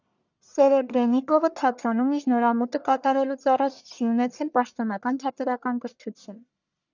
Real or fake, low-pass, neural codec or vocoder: fake; 7.2 kHz; codec, 44.1 kHz, 1.7 kbps, Pupu-Codec